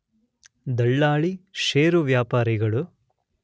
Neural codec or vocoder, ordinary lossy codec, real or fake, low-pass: none; none; real; none